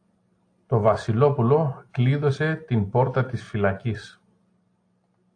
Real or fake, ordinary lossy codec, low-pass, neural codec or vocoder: real; AAC, 48 kbps; 9.9 kHz; none